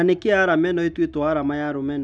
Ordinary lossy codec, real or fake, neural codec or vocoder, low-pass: none; real; none; none